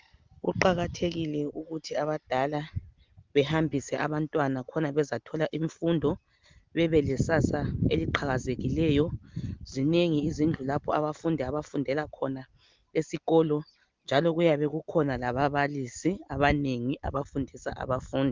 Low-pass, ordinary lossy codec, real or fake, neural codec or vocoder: 7.2 kHz; Opus, 32 kbps; real; none